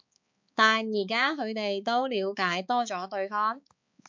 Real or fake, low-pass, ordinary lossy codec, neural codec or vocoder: fake; 7.2 kHz; MP3, 64 kbps; codec, 16 kHz, 4 kbps, X-Codec, HuBERT features, trained on balanced general audio